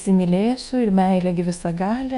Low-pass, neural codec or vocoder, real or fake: 10.8 kHz; codec, 24 kHz, 1.2 kbps, DualCodec; fake